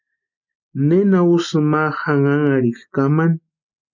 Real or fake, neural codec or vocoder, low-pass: real; none; 7.2 kHz